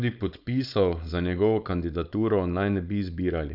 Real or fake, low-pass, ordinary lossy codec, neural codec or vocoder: fake; 5.4 kHz; none; codec, 16 kHz, 4.8 kbps, FACodec